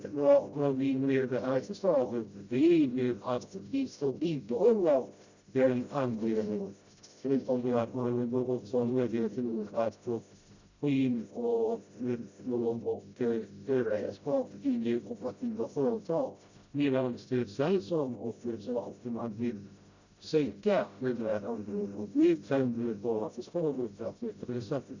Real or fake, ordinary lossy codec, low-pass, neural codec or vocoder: fake; Opus, 64 kbps; 7.2 kHz; codec, 16 kHz, 0.5 kbps, FreqCodec, smaller model